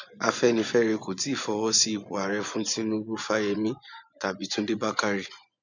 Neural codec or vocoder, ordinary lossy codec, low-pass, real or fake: vocoder, 24 kHz, 100 mel bands, Vocos; none; 7.2 kHz; fake